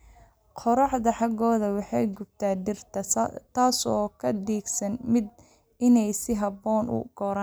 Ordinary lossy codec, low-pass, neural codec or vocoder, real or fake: none; none; none; real